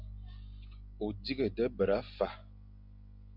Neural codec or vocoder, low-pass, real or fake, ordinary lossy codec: none; 5.4 kHz; real; Opus, 64 kbps